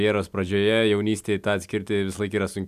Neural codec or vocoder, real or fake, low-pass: none; real; 14.4 kHz